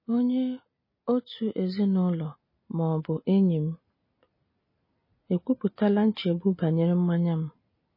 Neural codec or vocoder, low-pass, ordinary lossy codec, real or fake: none; 5.4 kHz; MP3, 24 kbps; real